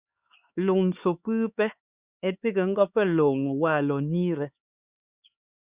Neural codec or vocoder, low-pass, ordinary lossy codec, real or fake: codec, 16 kHz, 4 kbps, X-Codec, HuBERT features, trained on LibriSpeech; 3.6 kHz; Opus, 64 kbps; fake